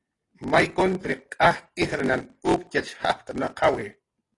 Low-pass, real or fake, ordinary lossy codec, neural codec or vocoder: 10.8 kHz; real; AAC, 32 kbps; none